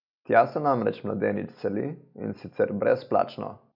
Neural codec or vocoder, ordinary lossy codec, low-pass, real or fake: none; none; 5.4 kHz; real